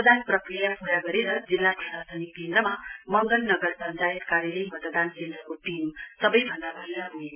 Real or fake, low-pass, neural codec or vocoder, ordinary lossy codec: real; 3.6 kHz; none; none